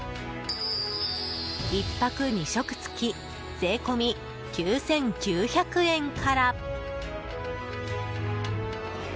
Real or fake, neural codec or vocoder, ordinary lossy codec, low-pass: real; none; none; none